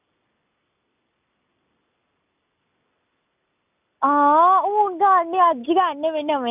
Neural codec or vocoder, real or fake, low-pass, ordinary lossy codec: none; real; 3.6 kHz; none